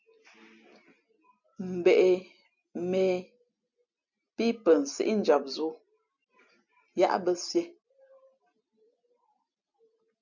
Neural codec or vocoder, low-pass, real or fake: none; 7.2 kHz; real